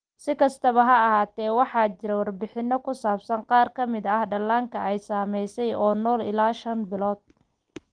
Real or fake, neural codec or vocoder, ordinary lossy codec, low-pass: real; none; Opus, 24 kbps; 9.9 kHz